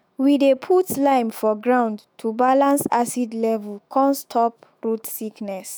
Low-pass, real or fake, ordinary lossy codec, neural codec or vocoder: none; fake; none; autoencoder, 48 kHz, 128 numbers a frame, DAC-VAE, trained on Japanese speech